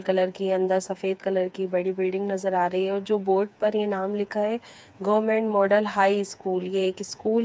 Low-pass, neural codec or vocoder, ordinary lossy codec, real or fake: none; codec, 16 kHz, 4 kbps, FreqCodec, smaller model; none; fake